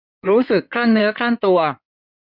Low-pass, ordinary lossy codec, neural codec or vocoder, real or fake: 5.4 kHz; none; codec, 16 kHz in and 24 kHz out, 2.2 kbps, FireRedTTS-2 codec; fake